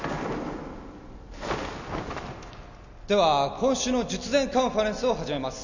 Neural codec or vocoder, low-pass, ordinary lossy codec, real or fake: none; 7.2 kHz; none; real